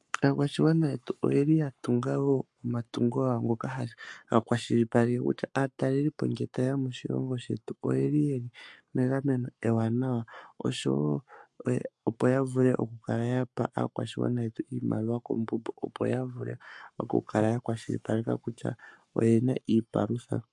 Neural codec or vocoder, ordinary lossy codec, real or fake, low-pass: codec, 44.1 kHz, 7.8 kbps, Pupu-Codec; MP3, 64 kbps; fake; 10.8 kHz